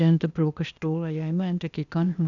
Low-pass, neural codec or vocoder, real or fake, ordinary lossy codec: 7.2 kHz; codec, 16 kHz, 0.8 kbps, ZipCodec; fake; none